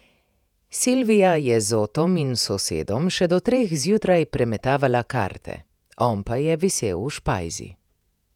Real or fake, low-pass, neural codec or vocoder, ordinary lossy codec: fake; 19.8 kHz; vocoder, 48 kHz, 128 mel bands, Vocos; none